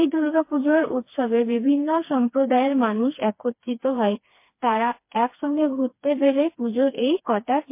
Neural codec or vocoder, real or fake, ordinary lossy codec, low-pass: codec, 16 kHz, 2 kbps, FreqCodec, smaller model; fake; MP3, 24 kbps; 3.6 kHz